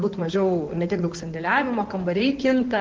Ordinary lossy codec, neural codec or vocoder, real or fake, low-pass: Opus, 16 kbps; codec, 16 kHz in and 24 kHz out, 2.2 kbps, FireRedTTS-2 codec; fake; 7.2 kHz